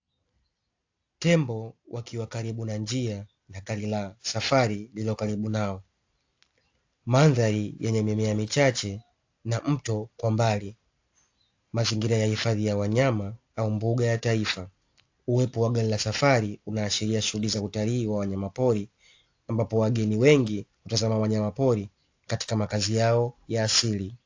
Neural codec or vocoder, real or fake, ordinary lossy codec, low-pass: none; real; AAC, 48 kbps; 7.2 kHz